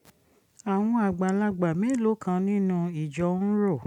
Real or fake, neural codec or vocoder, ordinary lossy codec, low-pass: real; none; none; 19.8 kHz